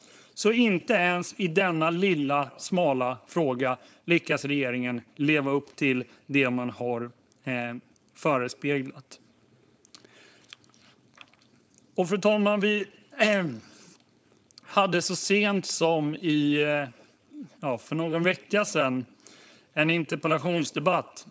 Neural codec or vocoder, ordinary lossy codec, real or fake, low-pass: codec, 16 kHz, 4.8 kbps, FACodec; none; fake; none